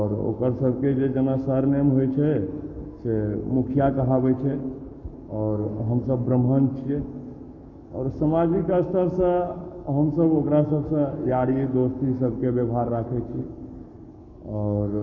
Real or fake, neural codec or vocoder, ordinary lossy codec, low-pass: fake; codec, 44.1 kHz, 7.8 kbps, Pupu-Codec; none; 7.2 kHz